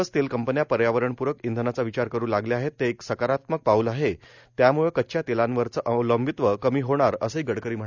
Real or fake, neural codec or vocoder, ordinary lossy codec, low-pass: real; none; none; 7.2 kHz